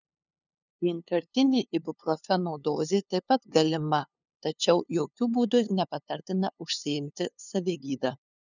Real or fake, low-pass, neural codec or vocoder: fake; 7.2 kHz; codec, 16 kHz, 2 kbps, FunCodec, trained on LibriTTS, 25 frames a second